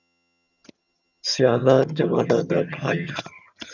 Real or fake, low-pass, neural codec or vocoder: fake; 7.2 kHz; vocoder, 22.05 kHz, 80 mel bands, HiFi-GAN